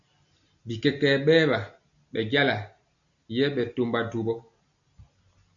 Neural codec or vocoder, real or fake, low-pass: none; real; 7.2 kHz